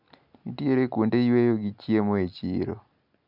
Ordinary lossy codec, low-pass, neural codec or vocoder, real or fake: none; 5.4 kHz; none; real